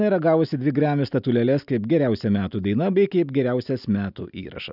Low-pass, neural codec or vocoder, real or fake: 5.4 kHz; none; real